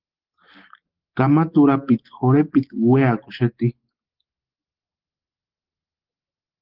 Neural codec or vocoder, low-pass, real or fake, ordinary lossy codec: none; 5.4 kHz; real; Opus, 16 kbps